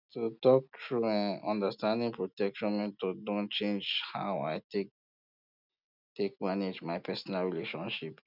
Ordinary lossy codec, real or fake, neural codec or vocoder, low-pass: none; real; none; 5.4 kHz